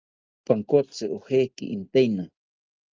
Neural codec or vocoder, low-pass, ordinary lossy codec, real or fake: none; 7.2 kHz; Opus, 24 kbps; real